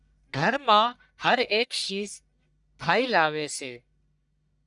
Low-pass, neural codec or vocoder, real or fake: 10.8 kHz; codec, 44.1 kHz, 1.7 kbps, Pupu-Codec; fake